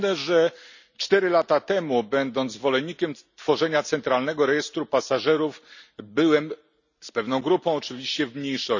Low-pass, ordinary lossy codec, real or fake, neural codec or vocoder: 7.2 kHz; none; real; none